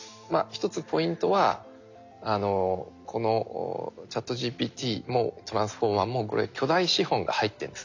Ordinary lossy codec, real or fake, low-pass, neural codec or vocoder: AAC, 48 kbps; real; 7.2 kHz; none